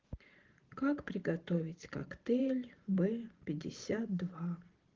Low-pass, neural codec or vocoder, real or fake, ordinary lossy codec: 7.2 kHz; vocoder, 44.1 kHz, 128 mel bands every 512 samples, BigVGAN v2; fake; Opus, 16 kbps